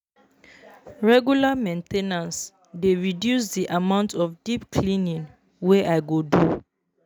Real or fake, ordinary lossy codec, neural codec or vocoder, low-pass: real; none; none; none